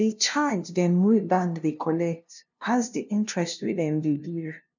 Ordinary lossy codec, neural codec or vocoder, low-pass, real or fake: none; codec, 16 kHz, 0.5 kbps, FunCodec, trained on LibriTTS, 25 frames a second; 7.2 kHz; fake